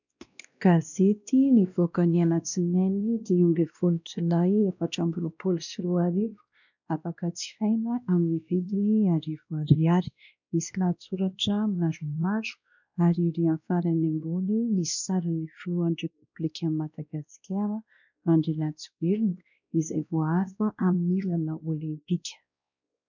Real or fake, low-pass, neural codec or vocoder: fake; 7.2 kHz; codec, 16 kHz, 1 kbps, X-Codec, WavLM features, trained on Multilingual LibriSpeech